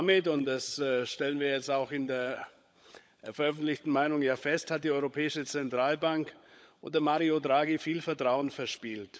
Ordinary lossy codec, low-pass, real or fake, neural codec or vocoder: none; none; fake; codec, 16 kHz, 16 kbps, FunCodec, trained on LibriTTS, 50 frames a second